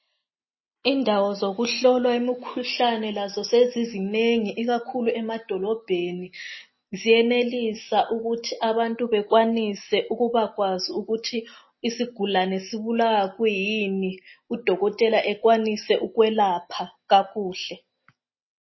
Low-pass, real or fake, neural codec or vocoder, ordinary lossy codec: 7.2 kHz; real; none; MP3, 24 kbps